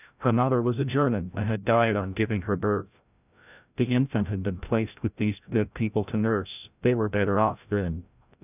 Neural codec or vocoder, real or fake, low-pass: codec, 16 kHz, 0.5 kbps, FreqCodec, larger model; fake; 3.6 kHz